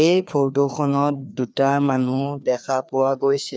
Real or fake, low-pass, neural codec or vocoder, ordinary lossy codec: fake; none; codec, 16 kHz, 2 kbps, FreqCodec, larger model; none